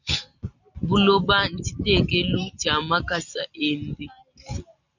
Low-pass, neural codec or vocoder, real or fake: 7.2 kHz; none; real